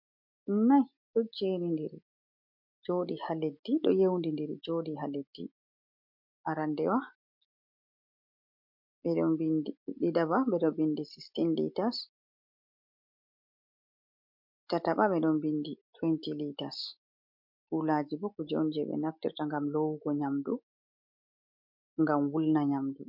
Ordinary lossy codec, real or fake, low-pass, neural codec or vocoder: MP3, 48 kbps; real; 5.4 kHz; none